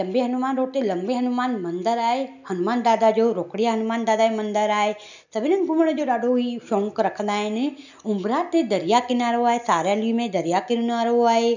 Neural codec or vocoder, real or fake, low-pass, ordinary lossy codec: none; real; 7.2 kHz; none